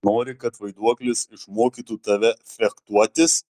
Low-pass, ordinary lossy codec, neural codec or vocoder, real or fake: 14.4 kHz; Opus, 32 kbps; none; real